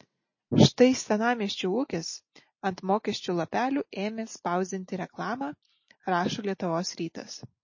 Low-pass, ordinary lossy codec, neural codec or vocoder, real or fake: 7.2 kHz; MP3, 32 kbps; none; real